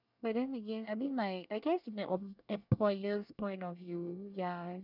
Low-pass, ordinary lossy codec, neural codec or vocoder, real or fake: 5.4 kHz; none; codec, 24 kHz, 1 kbps, SNAC; fake